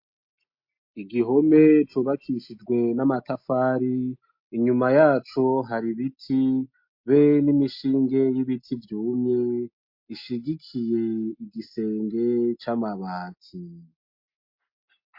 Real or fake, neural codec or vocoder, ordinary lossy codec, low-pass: real; none; MP3, 32 kbps; 5.4 kHz